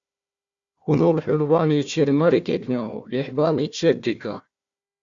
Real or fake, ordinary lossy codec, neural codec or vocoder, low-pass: fake; Opus, 64 kbps; codec, 16 kHz, 1 kbps, FunCodec, trained on Chinese and English, 50 frames a second; 7.2 kHz